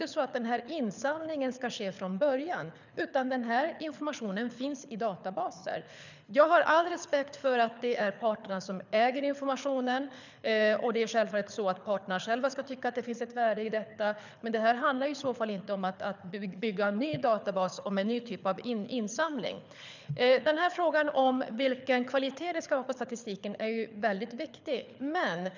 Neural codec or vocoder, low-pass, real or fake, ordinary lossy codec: codec, 24 kHz, 6 kbps, HILCodec; 7.2 kHz; fake; none